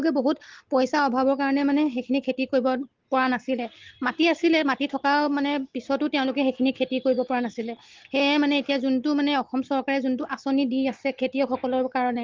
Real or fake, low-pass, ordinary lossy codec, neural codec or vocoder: real; 7.2 kHz; Opus, 16 kbps; none